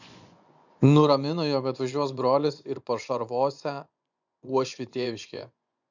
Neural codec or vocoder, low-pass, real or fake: vocoder, 24 kHz, 100 mel bands, Vocos; 7.2 kHz; fake